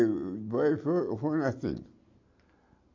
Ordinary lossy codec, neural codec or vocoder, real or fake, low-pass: MP3, 48 kbps; none; real; 7.2 kHz